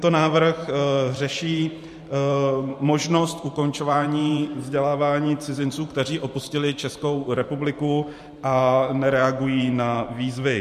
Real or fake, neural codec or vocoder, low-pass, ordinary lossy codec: fake; vocoder, 44.1 kHz, 128 mel bands every 512 samples, BigVGAN v2; 14.4 kHz; MP3, 64 kbps